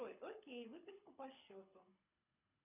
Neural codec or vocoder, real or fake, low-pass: codec, 24 kHz, 6 kbps, HILCodec; fake; 3.6 kHz